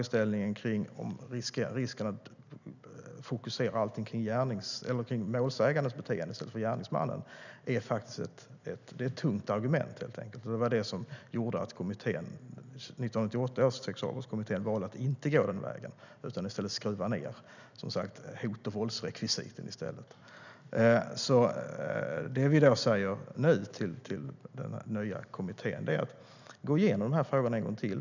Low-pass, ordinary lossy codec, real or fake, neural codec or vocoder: 7.2 kHz; none; real; none